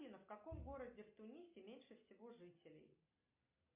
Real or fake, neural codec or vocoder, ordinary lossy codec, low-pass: real; none; MP3, 32 kbps; 3.6 kHz